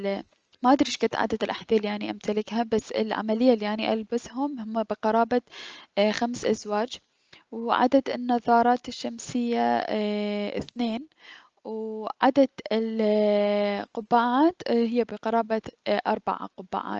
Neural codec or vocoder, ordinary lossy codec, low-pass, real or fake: none; Opus, 24 kbps; 7.2 kHz; real